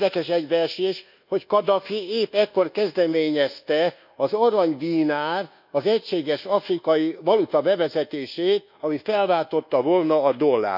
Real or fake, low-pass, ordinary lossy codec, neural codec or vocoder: fake; 5.4 kHz; none; codec, 24 kHz, 1.2 kbps, DualCodec